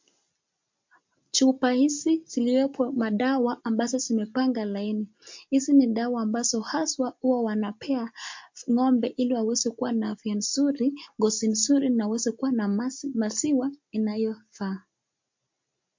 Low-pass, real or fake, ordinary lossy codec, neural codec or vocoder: 7.2 kHz; real; MP3, 48 kbps; none